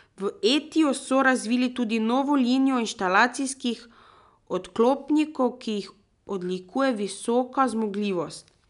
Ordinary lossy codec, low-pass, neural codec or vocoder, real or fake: none; 10.8 kHz; none; real